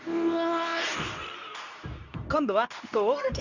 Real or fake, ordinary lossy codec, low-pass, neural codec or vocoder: fake; none; 7.2 kHz; codec, 24 kHz, 0.9 kbps, WavTokenizer, medium speech release version 2